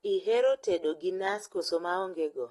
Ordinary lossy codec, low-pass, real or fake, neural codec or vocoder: AAC, 32 kbps; 19.8 kHz; real; none